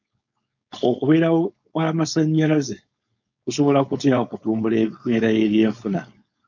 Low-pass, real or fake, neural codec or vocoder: 7.2 kHz; fake; codec, 16 kHz, 4.8 kbps, FACodec